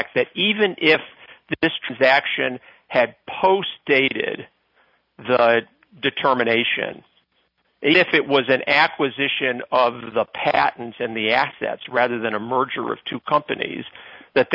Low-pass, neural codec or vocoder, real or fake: 5.4 kHz; none; real